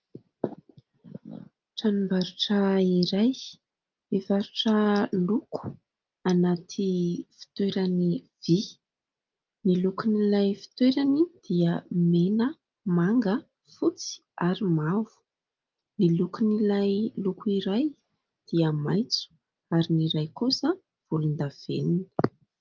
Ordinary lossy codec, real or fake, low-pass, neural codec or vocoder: Opus, 32 kbps; real; 7.2 kHz; none